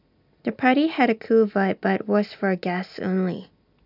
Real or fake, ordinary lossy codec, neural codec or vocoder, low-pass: real; none; none; 5.4 kHz